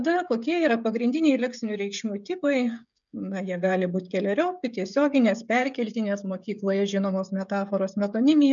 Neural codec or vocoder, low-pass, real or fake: codec, 16 kHz, 16 kbps, FreqCodec, smaller model; 7.2 kHz; fake